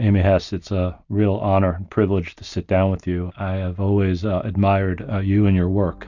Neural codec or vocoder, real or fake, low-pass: none; real; 7.2 kHz